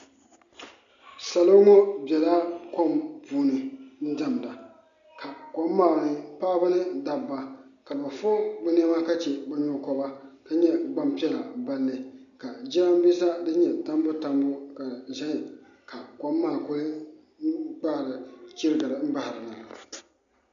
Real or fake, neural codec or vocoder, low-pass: real; none; 7.2 kHz